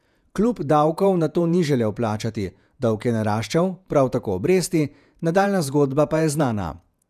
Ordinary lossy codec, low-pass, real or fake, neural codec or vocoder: none; 14.4 kHz; fake; vocoder, 48 kHz, 128 mel bands, Vocos